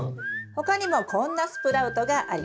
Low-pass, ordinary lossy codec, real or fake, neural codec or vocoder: none; none; real; none